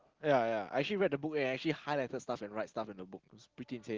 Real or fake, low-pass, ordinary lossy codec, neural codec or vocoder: real; 7.2 kHz; Opus, 16 kbps; none